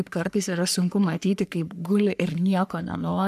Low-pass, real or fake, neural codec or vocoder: 14.4 kHz; fake; codec, 44.1 kHz, 3.4 kbps, Pupu-Codec